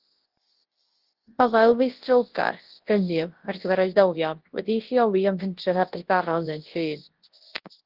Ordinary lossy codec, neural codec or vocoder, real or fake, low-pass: Opus, 16 kbps; codec, 24 kHz, 0.9 kbps, WavTokenizer, large speech release; fake; 5.4 kHz